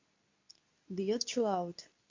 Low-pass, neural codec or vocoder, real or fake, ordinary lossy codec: 7.2 kHz; codec, 24 kHz, 0.9 kbps, WavTokenizer, medium speech release version 2; fake; MP3, 64 kbps